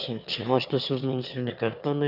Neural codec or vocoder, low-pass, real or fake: autoencoder, 22.05 kHz, a latent of 192 numbers a frame, VITS, trained on one speaker; 5.4 kHz; fake